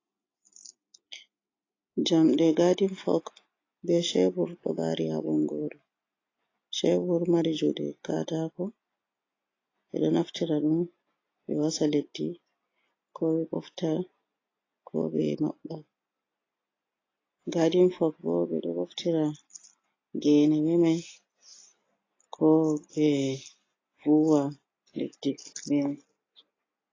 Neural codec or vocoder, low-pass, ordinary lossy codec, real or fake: none; 7.2 kHz; AAC, 32 kbps; real